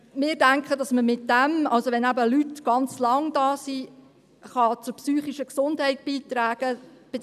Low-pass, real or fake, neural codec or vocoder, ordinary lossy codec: 14.4 kHz; real; none; none